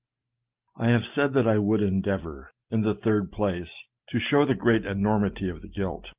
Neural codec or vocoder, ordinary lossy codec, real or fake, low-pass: none; Opus, 32 kbps; real; 3.6 kHz